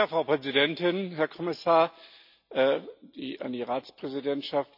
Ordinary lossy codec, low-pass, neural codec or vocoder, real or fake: none; 5.4 kHz; none; real